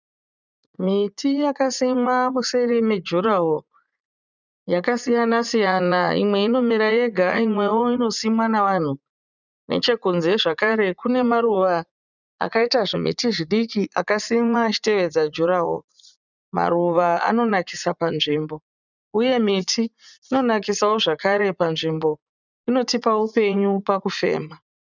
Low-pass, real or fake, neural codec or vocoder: 7.2 kHz; fake; vocoder, 44.1 kHz, 80 mel bands, Vocos